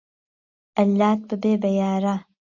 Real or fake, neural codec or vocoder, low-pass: real; none; 7.2 kHz